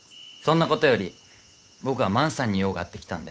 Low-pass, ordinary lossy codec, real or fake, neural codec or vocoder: none; none; fake; codec, 16 kHz, 8 kbps, FunCodec, trained on Chinese and English, 25 frames a second